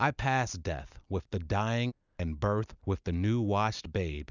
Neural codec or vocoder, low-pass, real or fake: none; 7.2 kHz; real